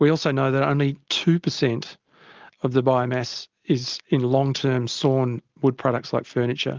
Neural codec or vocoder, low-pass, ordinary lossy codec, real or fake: none; 7.2 kHz; Opus, 32 kbps; real